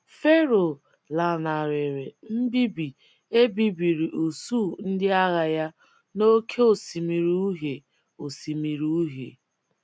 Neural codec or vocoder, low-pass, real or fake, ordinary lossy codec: none; none; real; none